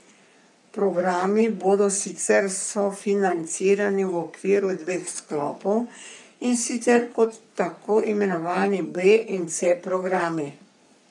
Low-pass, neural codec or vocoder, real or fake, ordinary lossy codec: 10.8 kHz; codec, 44.1 kHz, 3.4 kbps, Pupu-Codec; fake; none